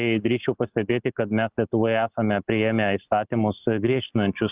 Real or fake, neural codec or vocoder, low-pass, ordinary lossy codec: real; none; 3.6 kHz; Opus, 32 kbps